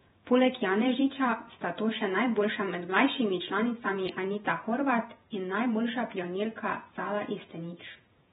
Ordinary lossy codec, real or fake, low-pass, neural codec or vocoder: AAC, 16 kbps; fake; 19.8 kHz; vocoder, 48 kHz, 128 mel bands, Vocos